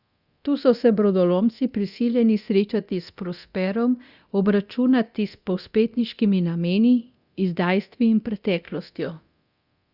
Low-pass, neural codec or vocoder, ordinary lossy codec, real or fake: 5.4 kHz; codec, 24 kHz, 0.9 kbps, DualCodec; Opus, 64 kbps; fake